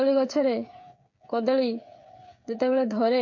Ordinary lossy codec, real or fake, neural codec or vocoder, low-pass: MP3, 48 kbps; fake; codec, 16 kHz, 8 kbps, FreqCodec, smaller model; 7.2 kHz